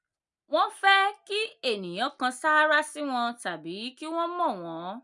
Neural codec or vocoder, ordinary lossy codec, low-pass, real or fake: none; none; 10.8 kHz; real